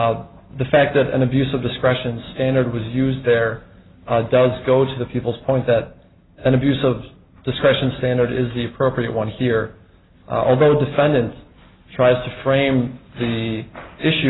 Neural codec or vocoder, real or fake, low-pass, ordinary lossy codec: none; real; 7.2 kHz; AAC, 16 kbps